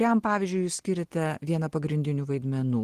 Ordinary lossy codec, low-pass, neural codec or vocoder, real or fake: Opus, 16 kbps; 14.4 kHz; none; real